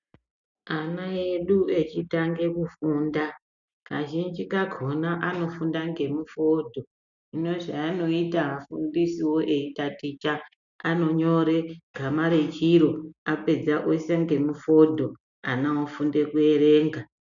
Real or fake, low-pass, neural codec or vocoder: real; 7.2 kHz; none